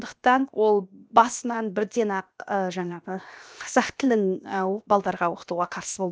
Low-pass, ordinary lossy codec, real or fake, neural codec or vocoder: none; none; fake; codec, 16 kHz, 0.7 kbps, FocalCodec